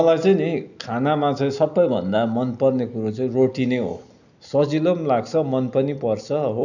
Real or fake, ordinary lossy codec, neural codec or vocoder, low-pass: real; none; none; 7.2 kHz